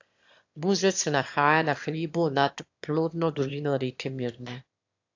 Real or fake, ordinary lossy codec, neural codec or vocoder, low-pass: fake; AAC, 48 kbps; autoencoder, 22.05 kHz, a latent of 192 numbers a frame, VITS, trained on one speaker; 7.2 kHz